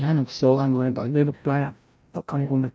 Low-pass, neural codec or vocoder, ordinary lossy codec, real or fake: none; codec, 16 kHz, 0.5 kbps, FreqCodec, larger model; none; fake